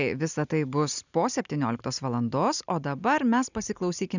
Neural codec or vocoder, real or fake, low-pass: none; real; 7.2 kHz